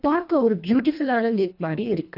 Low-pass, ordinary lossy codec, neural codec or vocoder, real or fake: 5.4 kHz; none; codec, 24 kHz, 1.5 kbps, HILCodec; fake